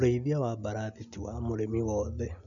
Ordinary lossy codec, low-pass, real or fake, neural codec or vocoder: none; 7.2 kHz; real; none